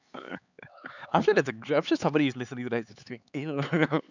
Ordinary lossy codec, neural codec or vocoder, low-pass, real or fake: none; codec, 16 kHz, 4 kbps, X-Codec, HuBERT features, trained on LibriSpeech; 7.2 kHz; fake